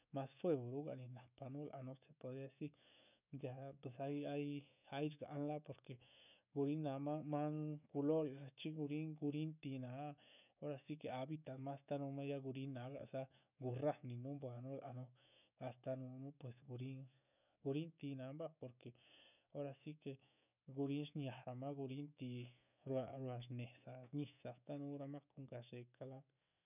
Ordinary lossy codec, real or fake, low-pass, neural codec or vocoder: none; fake; 3.6 kHz; autoencoder, 48 kHz, 128 numbers a frame, DAC-VAE, trained on Japanese speech